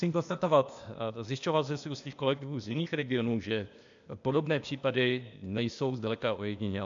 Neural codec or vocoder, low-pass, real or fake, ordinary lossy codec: codec, 16 kHz, 0.8 kbps, ZipCodec; 7.2 kHz; fake; MP3, 64 kbps